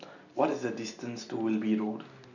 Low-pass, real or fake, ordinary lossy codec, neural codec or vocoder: 7.2 kHz; real; none; none